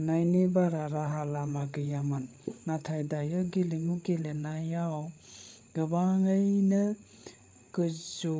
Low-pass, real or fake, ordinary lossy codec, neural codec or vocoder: none; fake; none; codec, 16 kHz, 8 kbps, FreqCodec, larger model